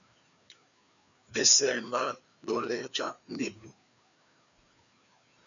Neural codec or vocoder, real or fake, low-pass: codec, 16 kHz, 2 kbps, FreqCodec, larger model; fake; 7.2 kHz